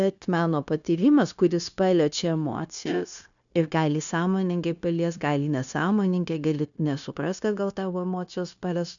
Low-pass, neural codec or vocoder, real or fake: 7.2 kHz; codec, 16 kHz, 0.9 kbps, LongCat-Audio-Codec; fake